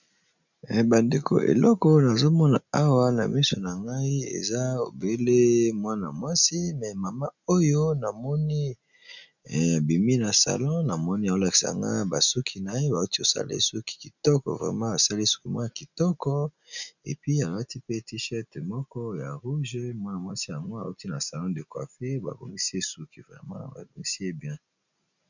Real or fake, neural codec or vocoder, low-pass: real; none; 7.2 kHz